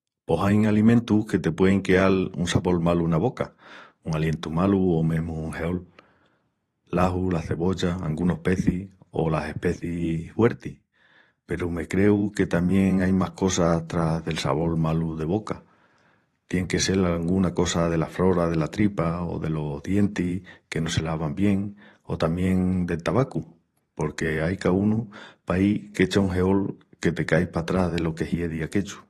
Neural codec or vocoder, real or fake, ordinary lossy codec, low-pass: vocoder, 44.1 kHz, 128 mel bands every 512 samples, BigVGAN v2; fake; AAC, 32 kbps; 19.8 kHz